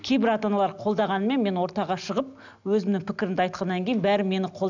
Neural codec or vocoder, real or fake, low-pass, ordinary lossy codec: none; real; 7.2 kHz; none